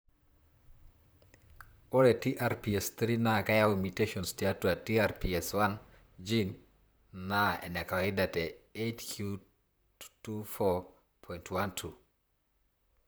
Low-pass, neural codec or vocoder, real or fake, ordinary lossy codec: none; vocoder, 44.1 kHz, 128 mel bands, Pupu-Vocoder; fake; none